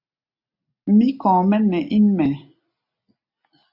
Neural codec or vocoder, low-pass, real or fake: none; 5.4 kHz; real